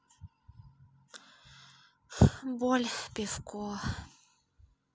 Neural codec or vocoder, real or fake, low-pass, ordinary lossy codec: none; real; none; none